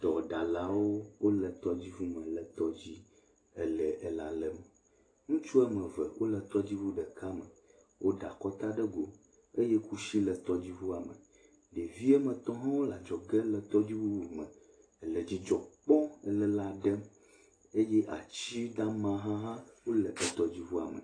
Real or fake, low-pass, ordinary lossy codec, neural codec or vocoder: real; 9.9 kHz; AAC, 32 kbps; none